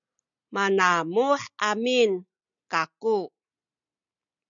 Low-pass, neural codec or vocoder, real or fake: 7.2 kHz; none; real